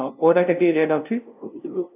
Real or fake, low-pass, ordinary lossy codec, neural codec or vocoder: fake; 3.6 kHz; none; codec, 16 kHz, 0.5 kbps, FunCodec, trained on LibriTTS, 25 frames a second